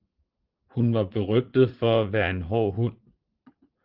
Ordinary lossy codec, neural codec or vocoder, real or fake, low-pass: Opus, 16 kbps; vocoder, 44.1 kHz, 80 mel bands, Vocos; fake; 5.4 kHz